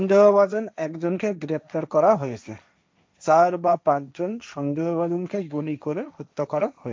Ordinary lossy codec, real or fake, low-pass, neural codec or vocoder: none; fake; none; codec, 16 kHz, 1.1 kbps, Voila-Tokenizer